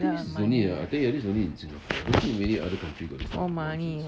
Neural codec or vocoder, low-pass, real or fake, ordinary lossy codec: none; none; real; none